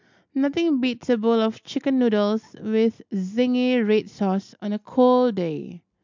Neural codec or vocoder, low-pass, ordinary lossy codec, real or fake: none; 7.2 kHz; MP3, 64 kbps; real